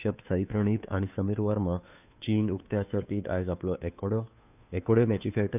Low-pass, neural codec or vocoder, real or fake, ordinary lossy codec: 3.6 kHz; codec, 16 kHz, 2 kbps, FunCodec, trained on Chinese and English, 25 frames a second; fake; none